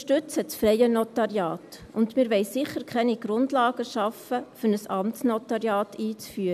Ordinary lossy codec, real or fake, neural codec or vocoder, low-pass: none; real; none; 14.4 kHz